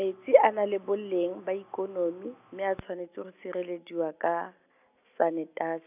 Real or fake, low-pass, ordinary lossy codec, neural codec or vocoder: real; 3.6 kHz; none; none